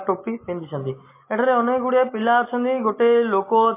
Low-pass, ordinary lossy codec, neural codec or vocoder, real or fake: 3.6 kHz; MP3, 24 kbps; none; real